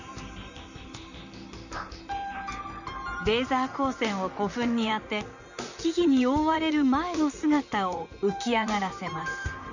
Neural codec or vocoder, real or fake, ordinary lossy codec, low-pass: vocoder, 44.1 kHz, 128 mel bands, Pupu-Vocoder; fake; none; 7.2 kHz